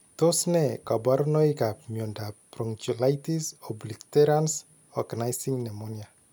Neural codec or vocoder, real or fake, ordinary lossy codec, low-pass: none; real; none; none